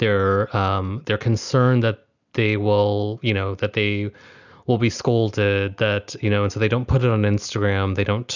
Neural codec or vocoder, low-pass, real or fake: none; 7.2 kHz; real